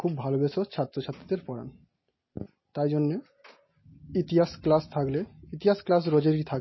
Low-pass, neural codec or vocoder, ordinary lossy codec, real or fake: 7.2 kHz; none; MP3, 24 kbps; real